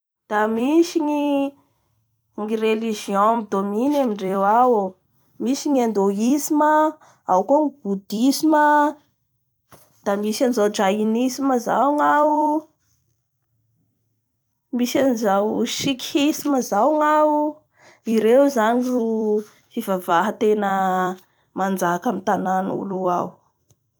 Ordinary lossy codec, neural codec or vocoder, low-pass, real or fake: none; vocoder, 44.1 kHz, 128 mel bands every 256 samples, BigVGAN v2; none; fake